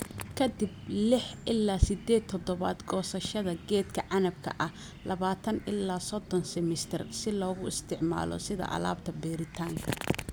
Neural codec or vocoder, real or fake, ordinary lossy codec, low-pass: none; real; none; none